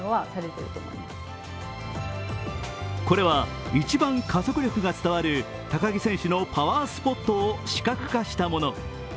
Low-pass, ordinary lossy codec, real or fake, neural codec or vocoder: none; none; real; none